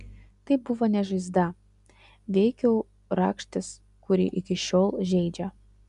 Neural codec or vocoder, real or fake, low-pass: none; real; 10.8 kHz